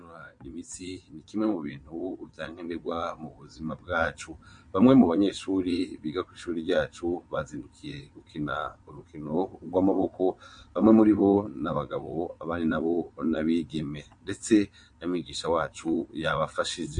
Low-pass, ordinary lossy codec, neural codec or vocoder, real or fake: 9.9 kHz; MP3, 48 kbps; vocoder, 22.05 kHz, 80 mel bands, WaveNeXt; fake